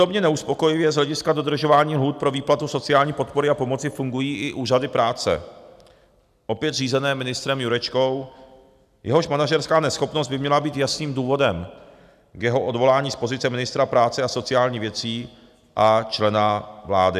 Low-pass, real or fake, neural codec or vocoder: 14.4 kHz; real; none